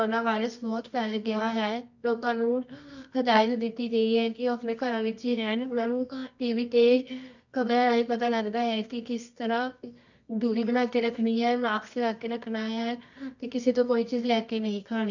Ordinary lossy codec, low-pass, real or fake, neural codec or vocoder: none; 7.2 kHz; fake; codec, 24 kHz, 0.9 kbps, WavTokenizer, medium music audio release